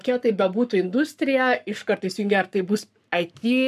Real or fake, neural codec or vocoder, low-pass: fake; codec, 44.1 kHz, 7.8 kbps, Pupu-Codec; 14.4 kHz